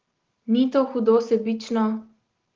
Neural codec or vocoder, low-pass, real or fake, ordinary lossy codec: none; 7.2 kHz; real; Opus, 16 kbps